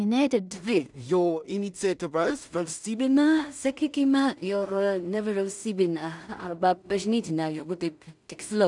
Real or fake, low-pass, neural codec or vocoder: fake; 10.8 kHz; codec, 16 kHz in and 24 kHz out, 0.4 kbps, LongCat-Audio-Codec, two codebook decoder